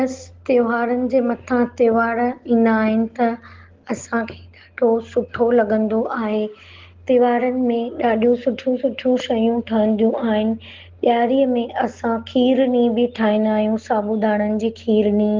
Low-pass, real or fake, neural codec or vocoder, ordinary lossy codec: 7.2 kHz; real; none; Opus, 16 kbps